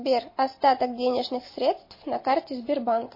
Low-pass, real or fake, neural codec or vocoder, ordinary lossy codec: 5.4 kHz; real; none; MP3, 24 kbps